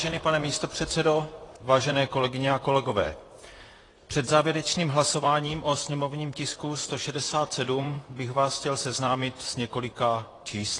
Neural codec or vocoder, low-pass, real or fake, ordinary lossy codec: vocoder, 44.1 kHz, 128 mel bands, Pupu-Vocoder; 10.8 kHz; fake; AAC, 32 kbps